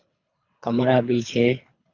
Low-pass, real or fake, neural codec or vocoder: 7.2 kHz; fake; codec, 24 kHz, 3 kbps, HILCodec